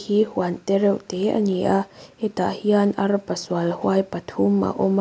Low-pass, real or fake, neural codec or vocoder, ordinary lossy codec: none; real; none; none